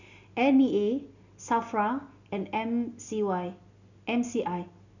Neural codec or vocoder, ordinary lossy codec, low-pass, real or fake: none; none; 7.2 kHz; real